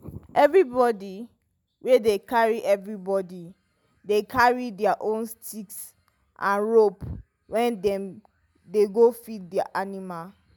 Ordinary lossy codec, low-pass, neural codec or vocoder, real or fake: none; 19.8 kHz; none; real